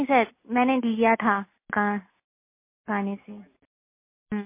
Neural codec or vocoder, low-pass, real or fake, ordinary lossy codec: none; 3.6 kHz; real; MP3, 24 kbps